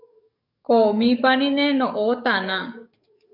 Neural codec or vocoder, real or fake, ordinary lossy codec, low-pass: codec, 44.1 kHz, 7.8 kbps, DAC; fake; MP3, 48 kbps; 5.4 kHz